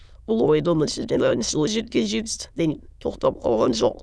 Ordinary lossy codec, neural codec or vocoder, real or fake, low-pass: none; autoencoder, 22.05 kHz, a latent of 192 numbers a frame, VITS, trained on many speakers; fake; none